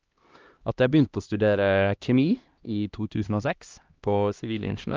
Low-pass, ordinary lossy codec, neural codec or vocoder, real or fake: 7.2 kHz; Opus, 24 kbps; codec, 16 kHz, 1 kbps, X-Codec, HuBERT features, trained on LibriSpeech; fake